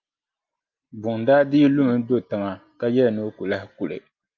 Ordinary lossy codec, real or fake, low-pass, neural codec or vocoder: Opus, 24 kbps; real; 7.2 kHz; none